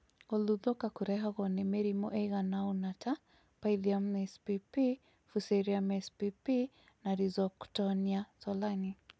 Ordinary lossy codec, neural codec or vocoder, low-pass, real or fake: none; none; none; real